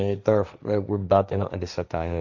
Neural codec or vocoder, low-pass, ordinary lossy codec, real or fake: codec, 16 kHz, 1.1 kbps, Voila-Tokenizer; 7.2 kHz; none; fake